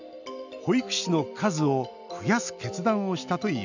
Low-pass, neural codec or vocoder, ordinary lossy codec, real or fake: 7.2 kHz; none; none; real